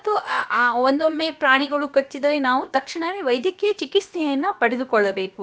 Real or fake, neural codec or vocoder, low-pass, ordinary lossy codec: fake; codec, 16 kHz, about 1 kbps, DyCAST, with the encoder's durations; none; none